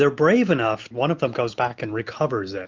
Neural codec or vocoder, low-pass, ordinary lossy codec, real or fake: none; 7.2 kHz; Opus, 24 kbps; real